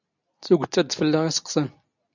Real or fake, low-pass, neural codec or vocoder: real; 7.2 kHz; none